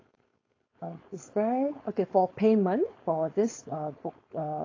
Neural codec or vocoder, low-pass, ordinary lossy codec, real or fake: codec, 16 kHz, 4.8 kbps, FACodec; 7.2 kHz; AAC, 32 kbps; fake